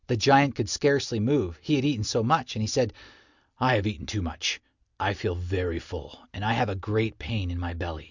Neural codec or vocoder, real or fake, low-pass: none; real; 7.2 kHz